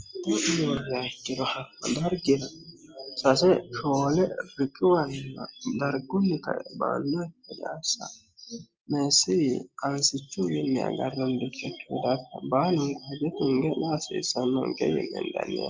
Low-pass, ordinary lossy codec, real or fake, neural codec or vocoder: 7.2 kHz; Opus, 24 kbps; real; none